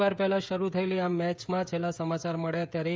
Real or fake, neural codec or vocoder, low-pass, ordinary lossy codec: fake; codec, 16 kHz, 16 kbps, FreqCodec, smaller model; none; none